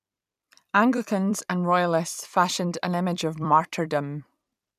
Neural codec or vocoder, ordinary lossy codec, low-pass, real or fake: vocoder, 44.1 kHz, 128 mel bands every 256 samples, BigVGAN v2; none; 14.4 kHz; fake